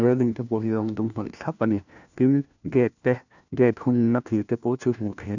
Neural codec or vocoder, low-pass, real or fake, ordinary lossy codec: codec, 16 kHz, 1 kbps, FunCodec, trained on LibriTTS, 50 frames a second; 7.2 kHz; fake; none